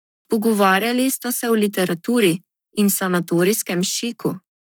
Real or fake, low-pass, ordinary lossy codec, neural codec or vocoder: fake; none; none; codec, 44.1 kHz, 7.8 kbps, Pupu-Codec